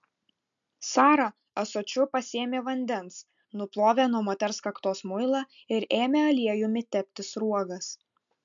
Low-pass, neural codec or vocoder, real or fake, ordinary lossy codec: 7.2 kHz; none; real; MP3, 64 kbps